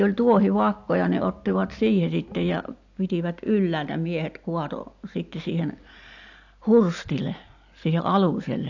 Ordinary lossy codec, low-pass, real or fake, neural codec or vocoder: AAC, 48 kbps; 7.2 kHz; real; none